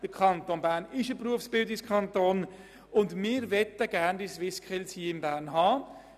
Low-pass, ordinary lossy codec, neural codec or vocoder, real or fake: 14.4 kHz; none; none; real